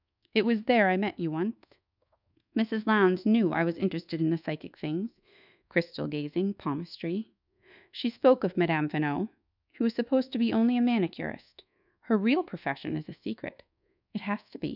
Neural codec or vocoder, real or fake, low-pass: codec, 24 kHz, 1.2 kbps, DualCodec; fake; 5.4 kHz